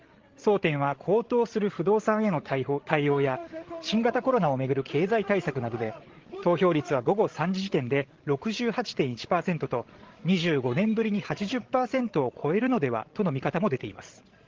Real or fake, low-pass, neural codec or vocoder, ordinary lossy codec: fake; 7.2 kHz; codec, 16 kHz, 16 kbps, FreqCodec, larger model; Opus, 16 kbps